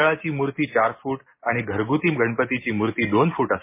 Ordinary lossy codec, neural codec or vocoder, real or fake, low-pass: MP3, 24 kbps; none; real; 3.6 kHz